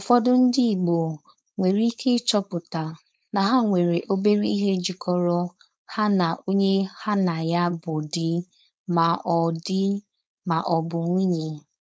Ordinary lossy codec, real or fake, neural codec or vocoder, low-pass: none; fake; codec, 16 kHz, 4.8 kbps, FACodec; none